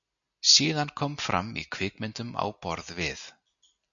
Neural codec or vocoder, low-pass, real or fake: none; 7.2 kHz; real